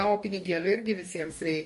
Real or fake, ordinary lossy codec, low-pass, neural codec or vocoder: fake; MP3, 48 kbps; 14.4 kHz; codec, 44.1 kHz, 2.6 kbps, DAC